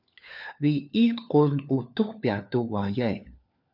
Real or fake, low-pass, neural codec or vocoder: fake; 5.4 kHz; codec, 16 kHz, 4 kbps, FunCodec, trained on LibriTTS, 50 frames a second